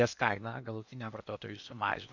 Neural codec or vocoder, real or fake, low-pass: codec, 16 kHz in and 24 kHz out, 0.8 kbps, FocalCodec, streaming, 65536 codes; fake; 7.2 kHz